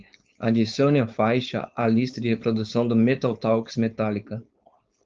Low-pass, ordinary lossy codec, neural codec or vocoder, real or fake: 7.2 kHz; Opus, 24 kbps; codec, 16 kHz, 4.8 kbps, FACodec; fake